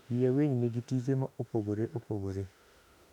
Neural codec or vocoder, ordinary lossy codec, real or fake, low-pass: autoencoder, 48 kHz, 32 numbers a frame, DAC-VAE, trained on Japanese speech; none; fake; 19.8 kHz